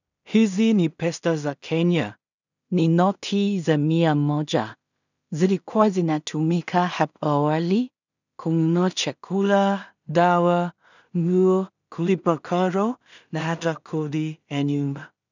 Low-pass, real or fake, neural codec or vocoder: 7.2 kHz; fake; codec, 16 kHz in and 24 kHz out, 0.4 kbps, LongCat-Audio-Codec, two codebook decoder